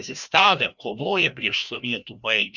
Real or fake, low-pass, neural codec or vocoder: fake; 7.2 kHz; codec, 16 kHz, 1 kbps, FreqCodec, larger model